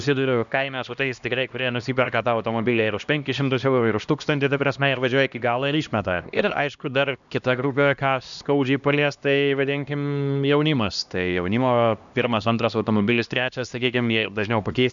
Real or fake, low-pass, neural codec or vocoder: fake; 7.2 kHz; codec, 16 kHz, 1 kbps, X-Codec, HuBERT features, trained on LibriSpeech